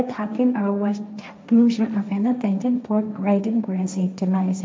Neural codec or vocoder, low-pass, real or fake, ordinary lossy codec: codec, 16 kHz, 1.1 kbps, Voila-Tokenizer; none; fake; none